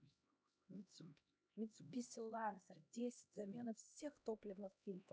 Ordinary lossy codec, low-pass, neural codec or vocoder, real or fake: none; none; codec, 16 kHz, 1 kbps, X-Codec, HuBERT features, trained on LibriSpeech; fake